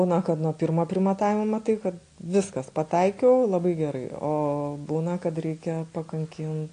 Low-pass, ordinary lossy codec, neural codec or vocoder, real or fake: 9.9 kHz; AAC, 48 kbps; none; real